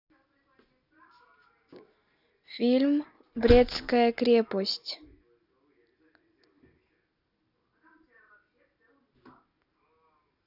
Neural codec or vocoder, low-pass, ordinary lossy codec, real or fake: none; 5.4 kHz; none; real